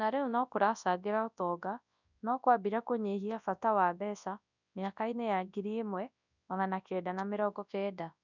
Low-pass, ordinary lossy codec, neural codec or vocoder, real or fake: 7.2 kHz; none; codec, 24 kHz, 0.9 kbps, WavTokenizer, large speech release; fake